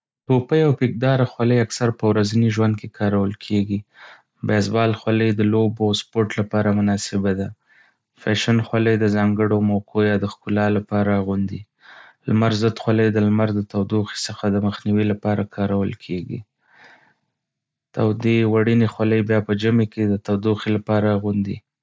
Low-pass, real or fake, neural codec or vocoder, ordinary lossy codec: none; real; none; none